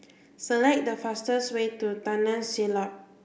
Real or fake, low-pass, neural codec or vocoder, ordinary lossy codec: real; none; none; none